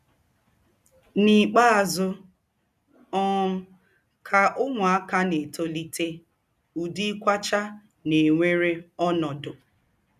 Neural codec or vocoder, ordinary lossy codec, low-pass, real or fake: none; none; 14.4 kHz; real